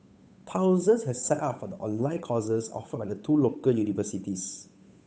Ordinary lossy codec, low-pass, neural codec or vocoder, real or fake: none; none; codec, 16 kHz, 8 kbps, FunCodec, trained on Chinese and English, 25 frames a second; fake